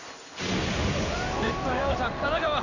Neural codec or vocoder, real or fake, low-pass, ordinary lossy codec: codec, 16 kHz in and 24 kHz out, 1 kbps, XY-Tokenizer; fake; 7.2 kHz; none